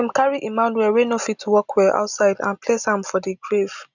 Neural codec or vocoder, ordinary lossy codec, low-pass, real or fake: none; none; 7.2 kHz; real